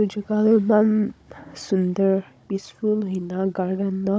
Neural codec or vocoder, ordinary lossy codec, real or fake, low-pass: codec, 16 kHz, 16 kbps, FunCodec, trained on Chinese and English, 50 frames a second; none; fake; none